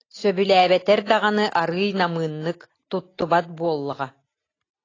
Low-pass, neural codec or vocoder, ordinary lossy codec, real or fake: 7.2 kHz; none; AAC, 32 kbps; real